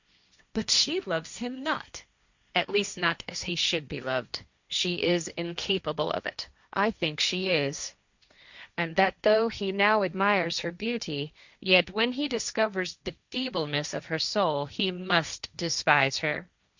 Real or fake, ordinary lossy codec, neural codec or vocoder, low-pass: fake; Opus, 64 kbps; codec, 16 kHz, 1.1 kbps, Voila-Tokenizer; 7.2 kHz